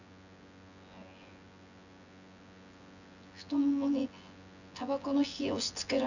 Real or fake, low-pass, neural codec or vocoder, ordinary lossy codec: fake; 7.2 kHz; vocoder, 24 kHz, 100 mel bands, Vocos; none